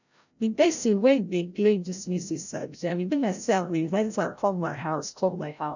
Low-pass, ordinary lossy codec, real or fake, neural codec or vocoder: 7.2 kHz; none; fake; codec, 16 kHz, 0.5 kbps, FreqCodec, larger model